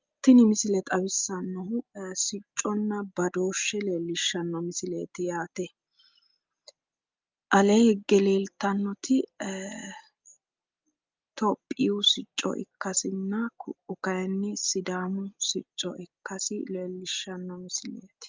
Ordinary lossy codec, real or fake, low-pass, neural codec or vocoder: Opus, 32 kbps; real; 7.2 kHz; none